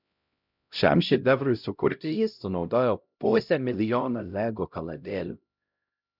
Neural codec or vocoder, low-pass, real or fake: codec, 16 kHz, 0.5 kbps, X-Codec, HuBERT features, trained on LibriSpeech; 5.4 kHz; fake